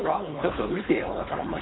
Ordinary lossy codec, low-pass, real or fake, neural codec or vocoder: AAC, 16 kbps; 7.2 kHz; fake; codec, 16 kHz, 4.8 kbps, FACodec